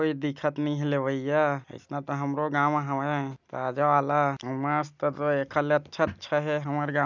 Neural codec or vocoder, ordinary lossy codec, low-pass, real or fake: none; none; 7.2 kHz; real